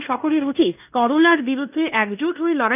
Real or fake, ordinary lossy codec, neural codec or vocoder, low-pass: fake; none; codec, 16 kHz in and 24 kHz out, 0.9 kbps, LongCat-Audio-Codec, fine tuned four codebook decoder; 3.6 kHz